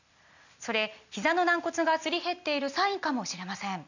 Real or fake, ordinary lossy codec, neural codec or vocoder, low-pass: real; none; none; 7.2 kHz